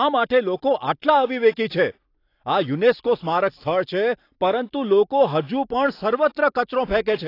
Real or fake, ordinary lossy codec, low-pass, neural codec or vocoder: real; AAC, 32 kbps; 5.4 kHz; none